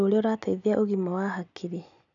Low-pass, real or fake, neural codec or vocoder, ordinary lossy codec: 7.2 kHz; real; none; none